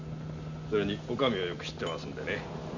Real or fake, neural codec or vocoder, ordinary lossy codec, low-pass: real; none; none; 7.2 kHz